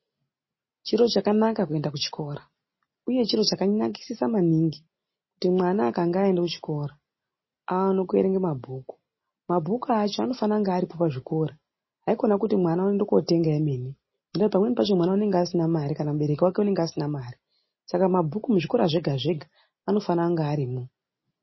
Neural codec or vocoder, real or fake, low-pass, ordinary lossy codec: none; real; 7.2 kHz; MP3, 24 kbps